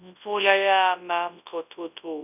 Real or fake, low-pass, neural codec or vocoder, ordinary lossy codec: fake; 3.6 kHz; codec, 24 kHz, 0.9 kbps, WavTokenizer, large speech release; none